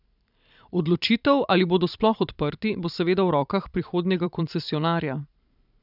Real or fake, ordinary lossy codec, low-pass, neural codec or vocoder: fake; none; 5.4 kHz; vocoder, 44.1 kHz, 128 mel bands every 512 samples, BigVGAN v2